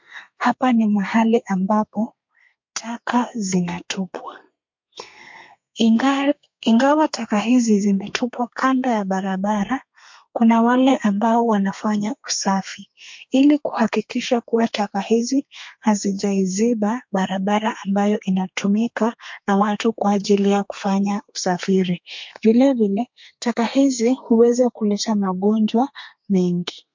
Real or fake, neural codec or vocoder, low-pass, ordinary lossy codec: fake; codec, 32 kHz, 1.9 kbps, SNAC; 7.2 kHz; MP3, 48 kbps